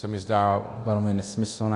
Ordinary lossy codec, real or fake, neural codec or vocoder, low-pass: AAC, 48 kbps; fake; codec, 24 kHz, 0.9 kbps, DualCodec; 10.8 kHz